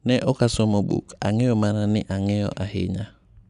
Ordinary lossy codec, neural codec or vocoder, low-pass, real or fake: none; none; 10.8 kHz; real